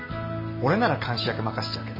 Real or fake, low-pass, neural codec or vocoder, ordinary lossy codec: real; 5.4 kHz; none; MP3, 24 kbps